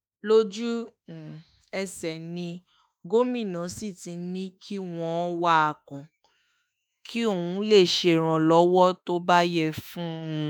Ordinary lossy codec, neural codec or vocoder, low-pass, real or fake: none; autoencoder, 48 kHz, 32 numbers a frame, DAC-VAE, trained on Japanese speech; none; fake